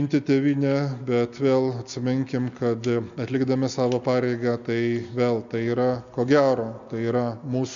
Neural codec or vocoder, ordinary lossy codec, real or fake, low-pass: none; MP3, 64 kbps; real; 7.2 kHz